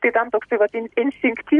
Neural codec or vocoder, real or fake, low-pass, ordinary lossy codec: none; real; 10.8 kHz; MP3, 64 kbps